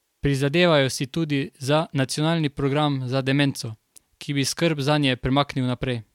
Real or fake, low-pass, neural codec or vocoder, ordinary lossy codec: real; 19.8 kHz; none; MP3, 96 kbps